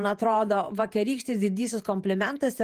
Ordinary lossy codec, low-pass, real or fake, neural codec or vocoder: Opus, 24 kbps; 14.4 kHz; fake; vocoder, 48 kHz, 128 mel bands, Vocos